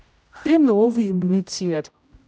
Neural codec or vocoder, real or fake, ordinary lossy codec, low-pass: codec, 16 kHz, 0.5 kbps, X-Codec, HuBERT features, trained on general audio; fake; none; none